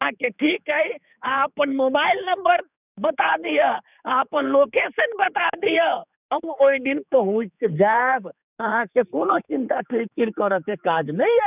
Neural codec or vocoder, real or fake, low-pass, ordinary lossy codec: codec, 16 kHz, 4 kbps, X-Codec, HuBERT features, trained on general audio; fake; 3.6 kHz; none